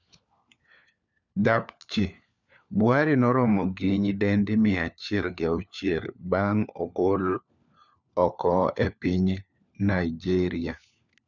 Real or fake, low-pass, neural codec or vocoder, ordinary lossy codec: fake; 7.2 kHz; codec, 16 kHz, 4 kbps, FunCodec, trained on LibriTTS, 50 frames a second; none